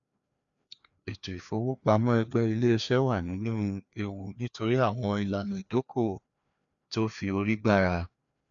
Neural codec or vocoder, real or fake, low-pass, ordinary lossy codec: codec, 16 kHz, 2 kbps, FreqCodec, larger model; fake; 7.2 kHz; none